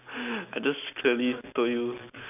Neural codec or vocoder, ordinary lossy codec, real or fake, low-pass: none; none; real; 3.6 kHz